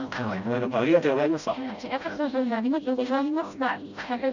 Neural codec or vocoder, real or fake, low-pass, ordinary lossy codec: codec, 16 kHz, 0.5 kbps, FreqCodec, smaller model; fake; 7.2 kHz; none